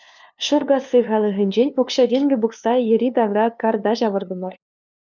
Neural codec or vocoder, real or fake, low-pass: codec, 16 kHz, 2 kbps, FunCodec, trained on LibriTTS, 25 frames a second; fake; 7.2 kHz